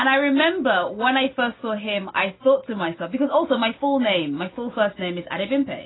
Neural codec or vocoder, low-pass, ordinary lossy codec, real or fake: none; 7.2 kHz; AAC, 16 kbps; real